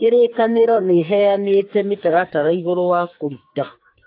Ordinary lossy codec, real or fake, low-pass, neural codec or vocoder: AAC, 32 kbps; fake; 5.4 kHz; codec, 44.1 kHz, 2.6 kbps, SNAC